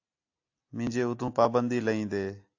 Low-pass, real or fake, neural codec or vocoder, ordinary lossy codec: 7.2 kHz; real; none; AAC, 48 kbps